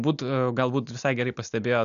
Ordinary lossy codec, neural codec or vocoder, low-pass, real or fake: MP3, 96 kbps; none; 7.2 kHz; real